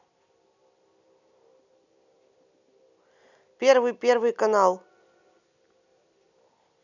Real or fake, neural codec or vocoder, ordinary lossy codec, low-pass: real; none; none; 7.2 kHz